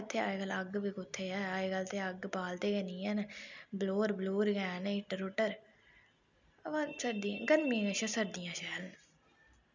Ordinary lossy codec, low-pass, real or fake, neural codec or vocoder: none; 7.2 kHz; real; none